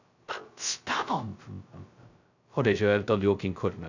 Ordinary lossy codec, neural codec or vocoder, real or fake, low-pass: none; codec, 16 kHz, 0.2 kbps, FocalCodec; fake; 7.2 kHz